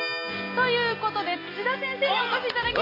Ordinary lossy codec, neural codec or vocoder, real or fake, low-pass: AAC, 24 kbps; none; real; 5.4 kHz